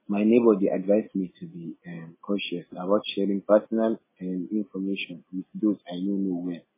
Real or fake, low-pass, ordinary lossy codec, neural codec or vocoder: real; 3.6 kHz; MP3, 16 kbps; none